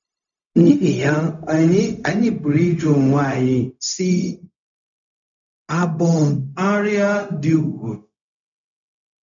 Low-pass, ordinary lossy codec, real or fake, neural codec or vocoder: 7.2 kHz; none; fake; codec, 16 kHz, 0.4 kbps, LongCat-Audio-Codec